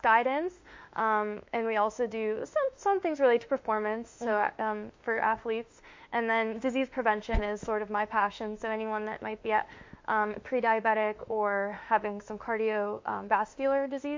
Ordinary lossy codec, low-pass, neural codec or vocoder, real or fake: MP3, 48 kbps; 7.2 kHz; autoencoder, 48 kHz, 32 numbers a frame, DAC-VAE, trained on Japanese speech; fake